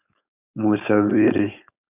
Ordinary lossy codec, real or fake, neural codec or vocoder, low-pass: AAC, 24 kbps; fake; codec, 16 kHz, 4.8 kbps, FACodec; 3.6 kHz